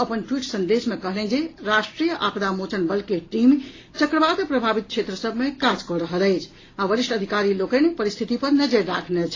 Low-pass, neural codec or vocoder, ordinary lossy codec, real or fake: 7.2 kHz; none; AAC, 32 kbps; real